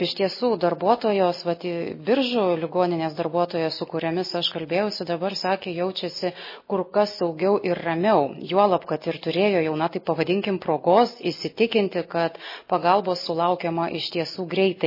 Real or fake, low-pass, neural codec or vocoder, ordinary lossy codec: real; 5.4 kHz; none; MP3, 24 kbps